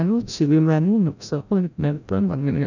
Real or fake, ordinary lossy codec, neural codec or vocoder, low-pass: fake; none; codec, 16 kHz, 0.5 kbps, FreqCodec, larger model; 7.2 kHz